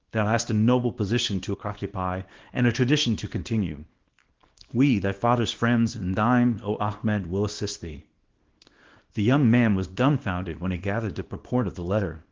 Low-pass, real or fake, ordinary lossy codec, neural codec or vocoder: 7.2 kHz; fake; Opus, 16 kbps; codec, 24 kHz, 0.9 kbps, WavTokenizer, small release